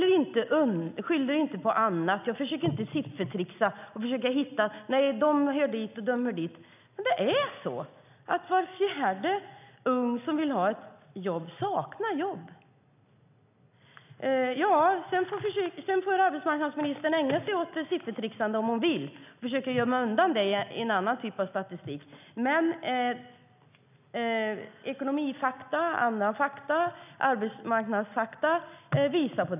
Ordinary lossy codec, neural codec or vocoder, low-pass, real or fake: none; none; 3.6 kHz; real